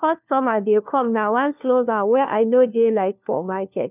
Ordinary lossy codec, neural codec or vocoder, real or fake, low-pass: none; codec, 16 kHz, 1 kbps, FunCodec, trained on LibriTTS, 50 frames a second; fake; 3.6 kHz